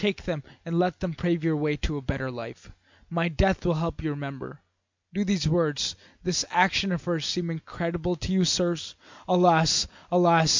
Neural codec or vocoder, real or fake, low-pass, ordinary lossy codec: none; real; 7.2 kHz; MP3, 64 kbps